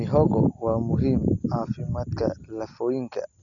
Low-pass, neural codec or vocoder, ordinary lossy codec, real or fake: 7.2 kHz; none; none; real